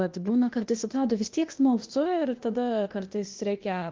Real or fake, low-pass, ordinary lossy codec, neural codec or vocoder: fake; 7.2 kHz; Opus, 32 kbps; codec, 16 kHz, 0.8 kbps, ZipCodec